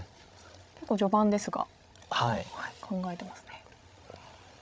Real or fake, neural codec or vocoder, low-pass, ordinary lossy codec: fake; codec, 16 kHz, 16 kbps, FreqCodec, larger model; none; none